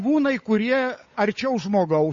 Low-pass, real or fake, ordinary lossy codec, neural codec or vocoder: 7.2 kHz; fake; MP3, 32 kbps; codec, 16 kHz, 8 kbps, FunCodec, trained on Chinese and English, 25 frames a second